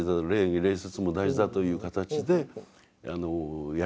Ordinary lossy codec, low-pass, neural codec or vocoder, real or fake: none; none; none; real